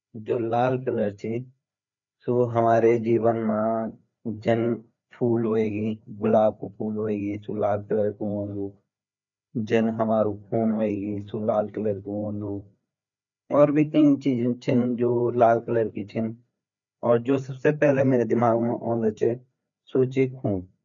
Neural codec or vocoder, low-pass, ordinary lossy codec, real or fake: codec, 16 kHz, 4 kbps, FreqCodec, larger model; 7.2 kHz; none; fake